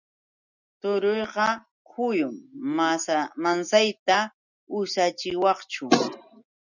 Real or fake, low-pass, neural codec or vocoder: real; 7.2 kHz; none